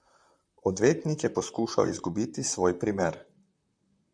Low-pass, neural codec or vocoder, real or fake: 9.9 kHz; vocoder, 44.1 kHz, 128 mel bands, Pupu-Vocoder; fake